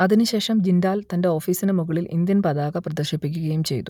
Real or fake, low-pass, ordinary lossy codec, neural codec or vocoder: real; 19.8 kHz; none; none